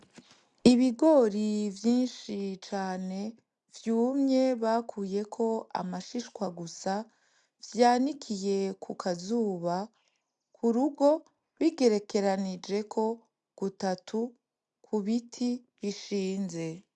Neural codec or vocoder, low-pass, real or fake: none; 10.8 kHz; real